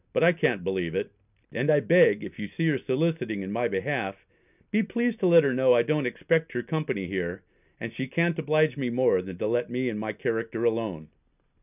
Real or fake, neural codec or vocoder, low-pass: real; none; 3.6 kHz